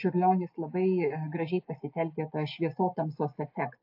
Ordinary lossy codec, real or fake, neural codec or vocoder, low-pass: AAC, 48 kbps; real; none; 5.4 kHz